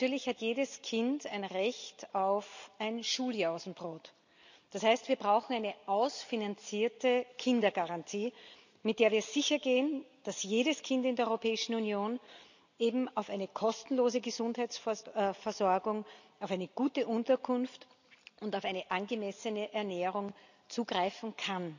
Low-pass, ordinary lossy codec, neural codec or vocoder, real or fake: 7.2 kHz; none; none; real